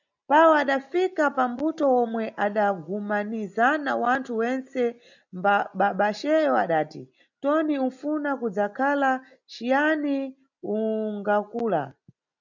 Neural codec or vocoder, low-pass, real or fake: none; 7.2 kHz; real